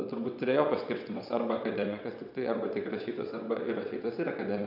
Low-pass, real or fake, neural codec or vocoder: 5.4 kHz; fake; vocoder, 22.05 kHz, 80 mel bands, WaveNeXt